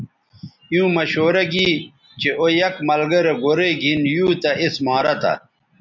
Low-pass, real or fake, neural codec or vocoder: 7.2 kHz; real; none